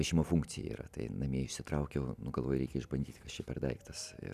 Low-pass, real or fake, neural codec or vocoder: 14.4 kHz; real; none